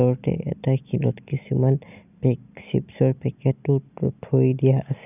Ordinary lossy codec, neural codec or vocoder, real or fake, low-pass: none; none; real; 3.6 kHz